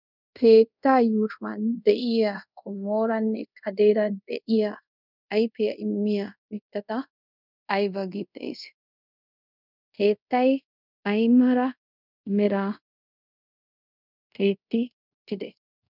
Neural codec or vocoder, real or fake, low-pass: codec, 24 kHz, 0.5 kbps, DualCodec; fake; 5.4 kHz